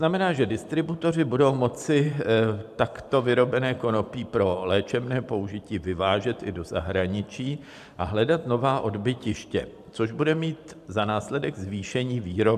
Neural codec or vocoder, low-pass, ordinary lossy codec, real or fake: vocoder, 44.1 kHz, 128 mel bands every 512 samples, BigVGAN v2; 14.4 kHz; AAC, 96 kbps; fake